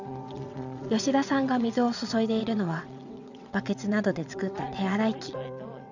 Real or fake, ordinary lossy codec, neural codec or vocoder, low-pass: fake; none; vocoder, 22.05 kHz, 80 mel bands, WaveNeXt; 7.2 kHz